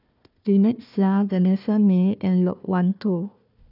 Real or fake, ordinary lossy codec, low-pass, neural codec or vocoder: fake; none; 5.4 kHz; codec, 16 kHz, 1 kbps, FunCodec, trained on Chinese and English, 50 frames a second